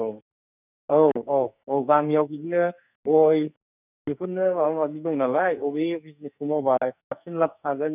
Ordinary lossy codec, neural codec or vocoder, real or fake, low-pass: none; codec, 44.1 kHz, 2.6 kbps, SNAC; fake; 3.6 kHz